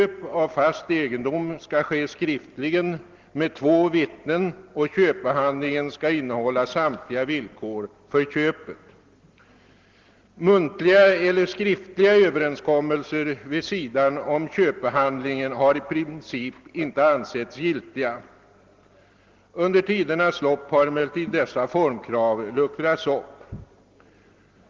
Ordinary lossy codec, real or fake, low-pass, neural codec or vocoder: Opus, 16 kbps; real; 7.2 kHz; none